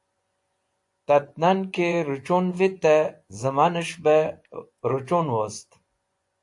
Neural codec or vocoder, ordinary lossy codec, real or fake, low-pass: vocoder, 24 kHz, 100 mel bands, Vocos; AAC, 64 kbps; fake; 10.8 kHz